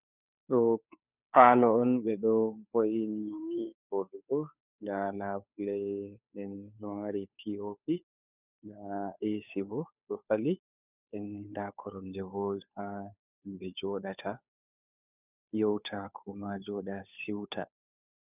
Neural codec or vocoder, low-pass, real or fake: codec, 16 kHz, 2 kbps, FunCodec, trained on Chinese and English, 25 frames a second; 3.6 kHz; fake